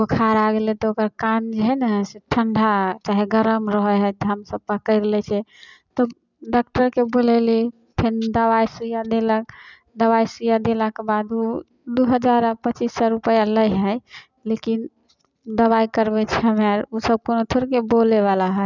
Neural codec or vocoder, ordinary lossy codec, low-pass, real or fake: none; none; 7.2 kHz; real